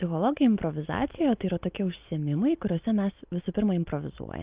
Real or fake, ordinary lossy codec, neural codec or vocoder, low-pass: real; Opus, 24 kbps; none; 3.6 kHz